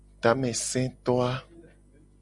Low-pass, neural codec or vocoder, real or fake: 10.8 kHz; none; real